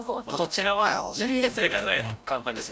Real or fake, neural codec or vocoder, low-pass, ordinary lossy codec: fake; codec, 16 kHz, 0.5 kbps, FreqCodec, larger model; none; none